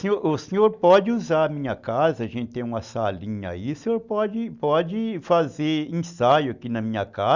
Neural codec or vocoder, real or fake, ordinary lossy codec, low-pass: none; real; Opus, 64 kbps; 7.2 kHz